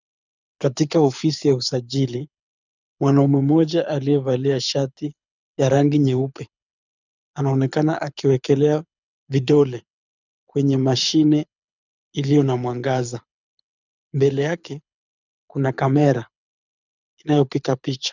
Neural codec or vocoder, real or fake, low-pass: codec, 24 kHz, 6 kbps, HILCodec; fake; 7.2 kHz